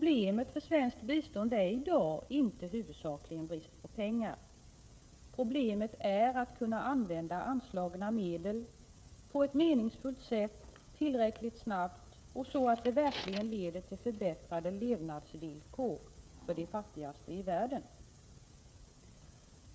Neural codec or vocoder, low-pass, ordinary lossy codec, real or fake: codec, 16 kHz, 16 kbps, FreqCodec, smaller model; none; none; fake